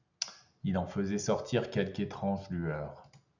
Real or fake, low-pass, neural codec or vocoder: real; 7.2 kHz; none